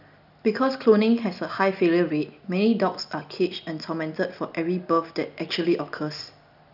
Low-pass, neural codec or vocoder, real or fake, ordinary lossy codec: 5.4 kHz; none; real; none